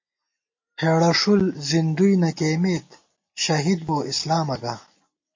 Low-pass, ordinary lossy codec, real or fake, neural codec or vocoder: 7.2 kHz; MP3, 32 kbps; real; none